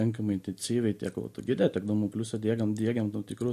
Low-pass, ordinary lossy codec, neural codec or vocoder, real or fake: 14.4 kHz; MP3, 64 kbps; none; real